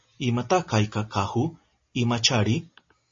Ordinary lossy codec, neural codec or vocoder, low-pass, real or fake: MP3, 32 kbps; none; 7.2 kHz; real